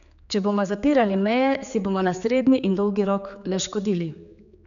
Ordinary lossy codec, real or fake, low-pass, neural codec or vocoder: none; fake; 7.2 kHz; codec, 16 kHz, 4 kbps, X-Codec, HuBERT features, trained on general audio